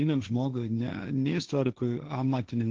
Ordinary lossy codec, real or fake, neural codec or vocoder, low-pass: Opus, 16 kbps; fake; codec, 16 kHz, 1.1 kbps, Voila-Tokenizer; 7.2 kHz